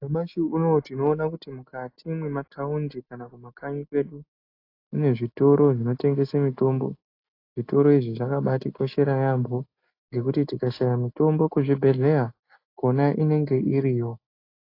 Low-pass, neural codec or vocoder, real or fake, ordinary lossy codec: 5.4 kHz; none; real; AAC, 32 kbps